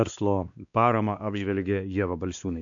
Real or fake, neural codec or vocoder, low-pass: fake; codec, 16 kHz, 2 kbps, X-Codec, WavLM features, trained on Multilingual LibriSpeech; 7.2 kHz